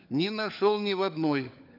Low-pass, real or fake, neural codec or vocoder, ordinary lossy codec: 5.4 kHz; fake; codec, 16 kHz, 4 kbps, FunCodec, trained on LibriTTS, 50 frames a second; none